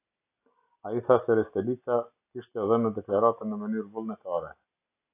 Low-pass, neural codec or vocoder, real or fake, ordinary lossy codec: 3.6 kHz; none; real; AAC, 24 kbps